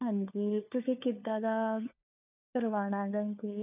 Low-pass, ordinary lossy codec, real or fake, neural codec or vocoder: 3.6 kHz; none; fake; codec, 16 kHz, 4 kbps, FunCodec, trained on LibriTTS, 50 frames a second